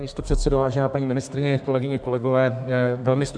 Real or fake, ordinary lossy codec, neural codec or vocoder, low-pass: fake; MP3, 96 kbps; codec, 32 kHz, 1.9 kbps, SNAC; 9.9 kHz